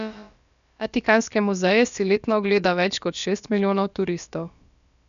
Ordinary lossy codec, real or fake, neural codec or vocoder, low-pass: none; fake; codec, 16 kHz, about 1 kbps, DyCAST, with the encoder's durations; 7.2 kHz